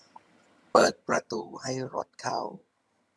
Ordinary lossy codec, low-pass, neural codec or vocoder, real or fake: none; none; vocoder, 22.05 kHz, 80 mel bands, HiFi-GAN; fake